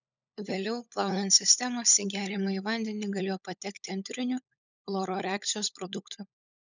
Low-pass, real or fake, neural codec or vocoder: 7.2 kHz; fake; codec, 16 kHz, 16 kbps, FunCodec, trained on LibriTTS, 50 frames a second